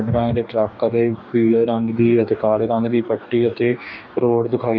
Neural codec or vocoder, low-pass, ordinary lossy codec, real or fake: codec, 44.1 kHz, 2.6 kbps, DAC; 7.2 kHz; none; fake